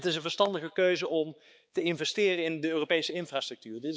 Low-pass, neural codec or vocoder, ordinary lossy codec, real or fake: none; codec, 16 kHz, 4 kbps, X-Codec, HuBERT features, trained on balanced general audio; none; fake